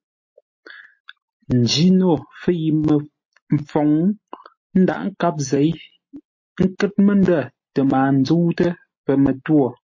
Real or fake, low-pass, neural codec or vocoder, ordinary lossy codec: real; 7.2 kHz; none; MP3, 32 kbps